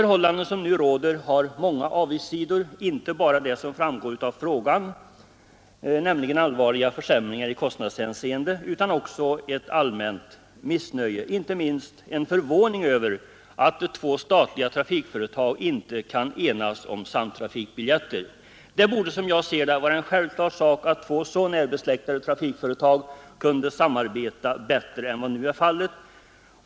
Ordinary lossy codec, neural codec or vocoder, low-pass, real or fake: none; none; none; real